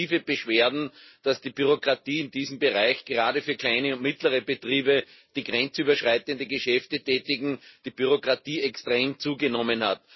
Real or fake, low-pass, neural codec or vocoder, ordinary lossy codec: real; 7.2 kHz; none; MP3, 24 kbps